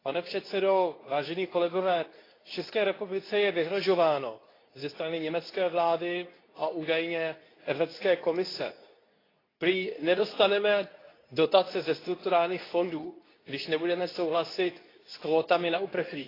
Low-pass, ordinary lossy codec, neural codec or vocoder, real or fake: 5.4 kHz; AAC, 24 kbps; codec, 24 kHz, 0.9 kbps, WavTokenizer, medium speech release version 2; fake